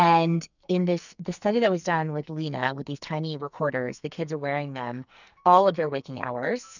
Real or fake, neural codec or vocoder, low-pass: fake; codec, 44.1 kHz, 2.6 kbps, SNAC; 7.2 kHz